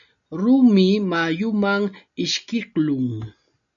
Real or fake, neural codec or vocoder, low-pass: real; none; 7.2 kHz